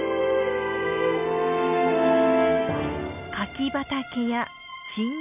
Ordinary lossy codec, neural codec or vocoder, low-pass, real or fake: none; none; 3.6 kHz; real